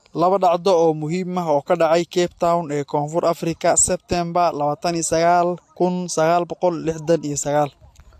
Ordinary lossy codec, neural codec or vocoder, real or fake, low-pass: AAC, 64 kbps; none; real; 14.4 kHz